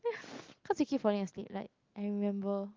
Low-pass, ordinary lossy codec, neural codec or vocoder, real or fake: 7.2 kHz; Opus, 24 kbps; none; real